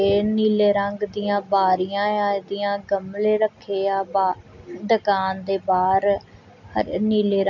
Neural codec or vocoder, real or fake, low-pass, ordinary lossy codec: none; real; 7.2 kHz; none